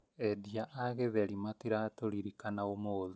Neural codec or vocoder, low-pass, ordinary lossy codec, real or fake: none; none; none; real